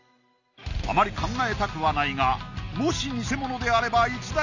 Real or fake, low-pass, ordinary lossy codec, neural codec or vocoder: real; 7.2 kHz; none; none